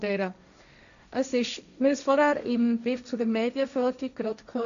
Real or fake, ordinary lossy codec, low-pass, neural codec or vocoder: fake; none; 7.2 kHz; codec, 16 kHz, 1.1 kbps, Voila-Tokenizer